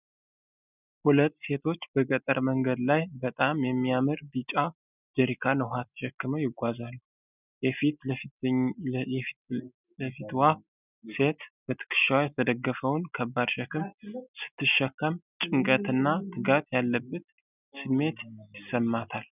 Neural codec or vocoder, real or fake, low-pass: none; real; 3.6 kHz